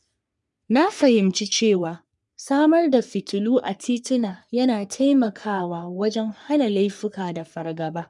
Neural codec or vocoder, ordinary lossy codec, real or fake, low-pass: codec, 44.1 kHz, 3.4 kbps, Pupu-Codec; none; fake; 10.8 kHz